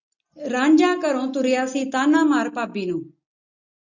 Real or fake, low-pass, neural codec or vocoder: real; 7.2 kHz; none